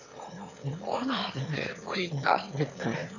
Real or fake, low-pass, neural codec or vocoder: fake; 7.2 kHz; autoencoder, 22.05 kHz, a latent of 192 numbers a frame, VITS, trained on one speaker